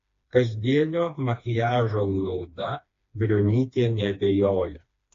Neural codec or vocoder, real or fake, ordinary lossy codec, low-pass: codec, 16 kHz, 2 kbps, FreqCodec, smaller model; fake; AAC, 48 kbps; 7.2 kHz